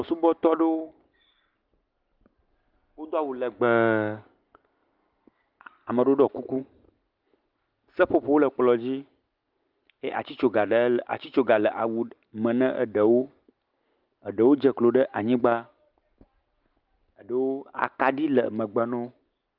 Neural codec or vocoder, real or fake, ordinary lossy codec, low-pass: none; real; Opus, 24 kbps; 5.4 kHz